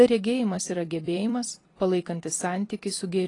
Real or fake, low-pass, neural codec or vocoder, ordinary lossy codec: fake; 10.8 kHz; vocoder, 44.1 kHz, 128 mel bands every 256 samples, BigVGAN v2; AAC, 32 kbps